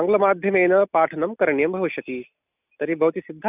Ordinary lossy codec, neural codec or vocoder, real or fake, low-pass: none; none; real; 3.6 kHz